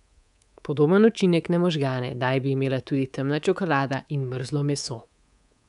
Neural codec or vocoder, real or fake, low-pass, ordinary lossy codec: codec, 24 kHz, 3.1 kbps, DualCodec; fake; 10.8 kHz; none